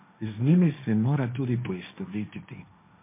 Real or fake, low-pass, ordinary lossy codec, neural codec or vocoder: fake; 3.6 kHz; MP3, 32 kbps; codec, 16 kHz, 1.1 kbps, Voila-Tokenizer